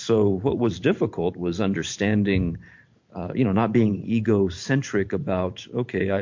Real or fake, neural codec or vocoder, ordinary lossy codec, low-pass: fake; vocoder, 44.1 kHz, 128 mel bands every 512 samples, BigVGAN v2; MP3, 48 kbps; 7.2 kHz